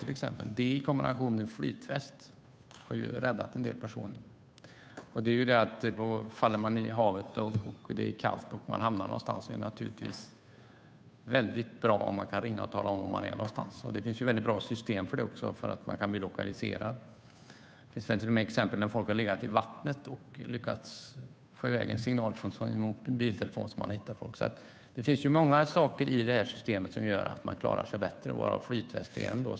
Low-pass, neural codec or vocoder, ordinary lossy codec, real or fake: none; codec, 16 kHz, 2 kbps, FunCodec, trained on Chinese and English, 25 frames a second; none; fake